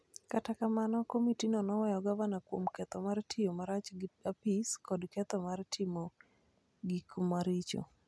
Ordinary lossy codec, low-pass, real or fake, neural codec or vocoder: none; none; real; none